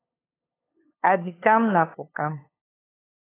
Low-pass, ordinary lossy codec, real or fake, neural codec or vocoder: 3.6 kHz; AAC, 16 kbps; fake; codec, 16 kHz, 8 kbps, FunCodec, trained on LibriTTS, 25 frames a second